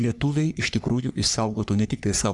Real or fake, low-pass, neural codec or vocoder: fake; 10.8 kHz; codec, 44.1 kHz, 3.4 kbps, Pupu-Codec